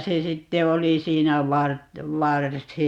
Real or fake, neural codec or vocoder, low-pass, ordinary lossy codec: real; none; 19.8 kHz; Opus, 64 kbps